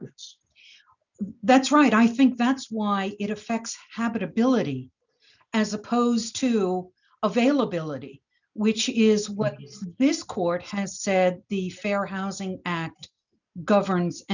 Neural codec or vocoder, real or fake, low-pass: none; real; 7.2 kHz